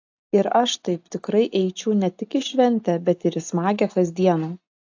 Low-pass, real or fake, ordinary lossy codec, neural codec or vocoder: 7.2 kHz; real; AAC, 48 kbps; none